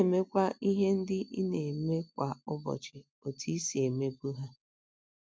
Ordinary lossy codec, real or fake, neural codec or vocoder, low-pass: none; real; none; none